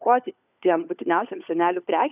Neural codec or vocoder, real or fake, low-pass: codec, 16 kHz, 4 kbps, FunCodec, trained on LibriTTS, 50 frames a second; fake; 3.6 kHz